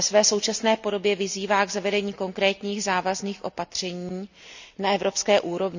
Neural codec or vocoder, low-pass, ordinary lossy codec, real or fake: none; 7.2 kHz; none; real